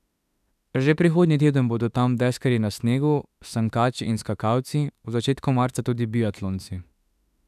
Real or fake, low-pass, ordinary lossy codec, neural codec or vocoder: fake; 14.4 kHz; none; autoencoder, 48 kHz, 32 numbers a frame, DAC-VAE, trained on Japanese speech